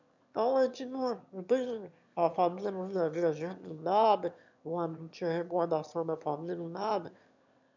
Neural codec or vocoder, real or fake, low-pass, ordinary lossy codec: autoencoder, 22.05 kHz, a latent of 192 numbers a frame, VITS, trained on one speaker; fake; 7.2 kHz; none